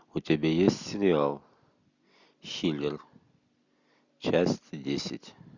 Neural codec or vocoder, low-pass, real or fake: none; 7.2 kHz; real